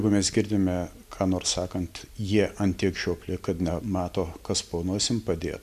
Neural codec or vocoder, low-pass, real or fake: none; 14.4 kHz; real